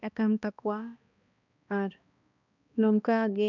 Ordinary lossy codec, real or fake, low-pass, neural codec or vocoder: none; fake; 7.2 kHz; codec, 16 kHz, 1 kbps, X-Codec, HuBERT features, trained on balanced general audio